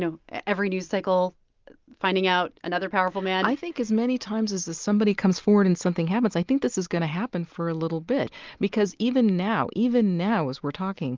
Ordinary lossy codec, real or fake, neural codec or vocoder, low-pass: Opus, 32 kbps; real; none; 7.2 kHz